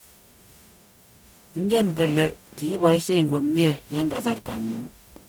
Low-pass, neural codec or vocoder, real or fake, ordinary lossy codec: none; codec, 44.1 kHz, 0.9 kbps, DAC; fake; none